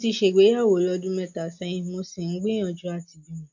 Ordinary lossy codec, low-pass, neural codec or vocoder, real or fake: MP3, 48 kbps; 7.2 kHz; none; real